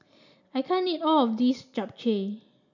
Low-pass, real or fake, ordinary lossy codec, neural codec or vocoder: 7.2 kHz; real; none; none